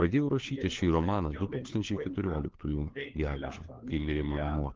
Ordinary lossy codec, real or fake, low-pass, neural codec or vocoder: Opus, 16 kbps; fake; 7.2 kHz; codec, 16 kHz, 4 kbps, FunCodec, trained on LibriTTS, 50 frames a second